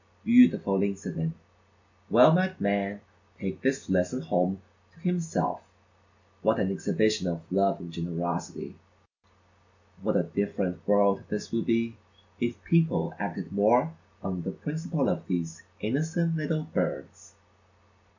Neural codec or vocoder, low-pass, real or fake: none; 7.2 kHz; real